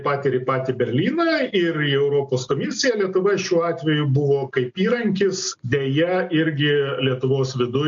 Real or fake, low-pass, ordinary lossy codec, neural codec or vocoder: real; 7.2 kHz; MP3, 48 kbps; none